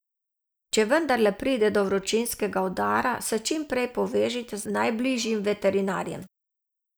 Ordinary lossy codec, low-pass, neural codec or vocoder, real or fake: none; none; none; real